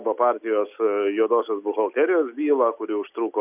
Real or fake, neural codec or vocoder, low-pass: real; none; 3.6 kHz